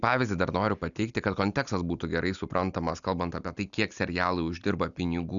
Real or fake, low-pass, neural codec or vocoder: real; 7.2 kHz; none